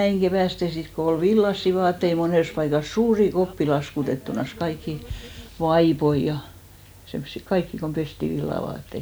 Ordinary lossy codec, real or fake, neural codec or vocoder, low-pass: none; real; none; none